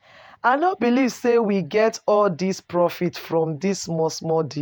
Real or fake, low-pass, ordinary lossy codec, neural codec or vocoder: fake; none; none; vocoder, 48 kHz, 128 mel bands, Vocos